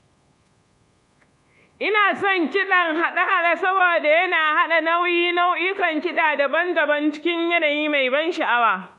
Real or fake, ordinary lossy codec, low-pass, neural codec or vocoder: fake; none; 10.8 kHz; codec, 24 kHz, 1.2 kbps, DualCodec